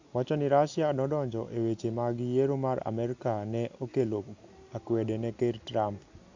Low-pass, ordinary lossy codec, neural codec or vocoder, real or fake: 7.2 kHz; AAC, 48 kbps; none; real